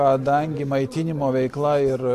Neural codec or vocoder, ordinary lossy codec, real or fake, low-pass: none; AAC, 64 kbps; real; 14.4 kHz